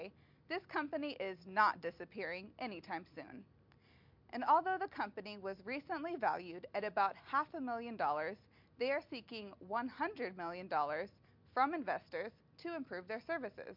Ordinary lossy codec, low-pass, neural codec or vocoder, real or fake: MP3, 48 kbps; 5.4 kHz; none; real